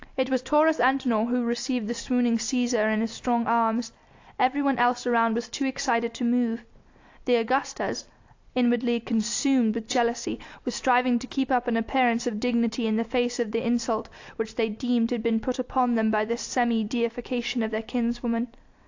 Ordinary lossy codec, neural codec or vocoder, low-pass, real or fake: AAC, 48 kbps; none; 7.2 kHz; real